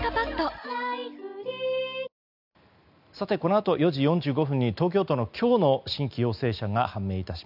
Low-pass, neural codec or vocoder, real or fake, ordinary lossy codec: 5.4 kHz; none; real; none